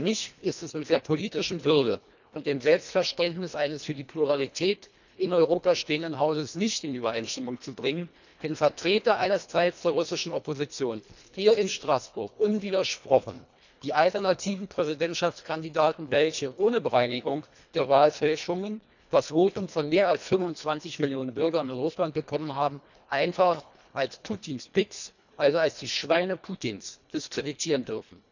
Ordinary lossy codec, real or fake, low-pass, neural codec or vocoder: none; fake; 7.2 kHz; codec, 24 kHz, 1.5 kbps, HILCodec